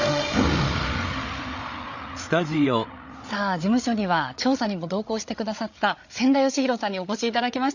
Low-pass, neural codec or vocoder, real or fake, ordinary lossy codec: 7.2 kHz; codec, 16 kHz, 8 kbps, FreqCodec, larger model; fake; none